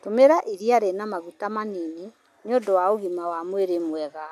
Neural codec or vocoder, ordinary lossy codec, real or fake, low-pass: none; none; real; 14.4 kHz